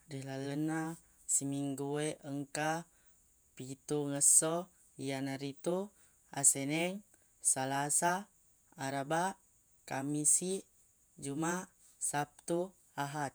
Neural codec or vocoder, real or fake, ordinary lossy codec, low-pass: vocoder, 48 kHz, 128 mel bands, Vocos; fake; none; none